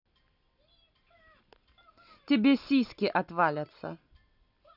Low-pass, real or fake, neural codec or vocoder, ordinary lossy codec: 5.4 kHz; real; none; none